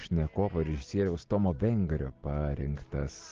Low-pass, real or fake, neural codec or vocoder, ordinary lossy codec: 7.2 kHz; real; none; Opus, 16 kbps